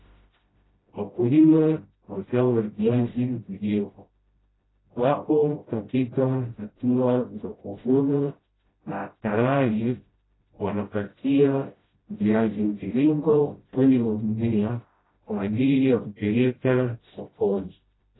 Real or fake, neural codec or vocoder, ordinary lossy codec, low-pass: fake; codec, 16 kHz, 0.5 kbps, FreqCodec, smaller model; AAC, 16 kbps; 7.2 kHz